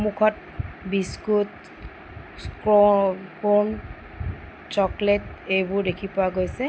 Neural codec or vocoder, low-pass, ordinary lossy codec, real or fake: none; none; none; real